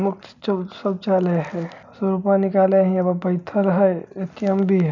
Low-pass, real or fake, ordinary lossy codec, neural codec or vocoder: 7.2 kHz; real; none; none